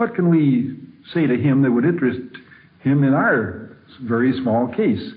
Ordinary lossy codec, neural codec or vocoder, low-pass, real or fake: AAC, 32 kbps; none; 5.4 kHz; real